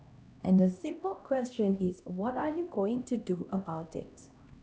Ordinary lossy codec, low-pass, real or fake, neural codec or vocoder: none; none; fake; codec, 16 kHz, 1 kbps, X-Codec, HuBERT features, trained on LibriSpeech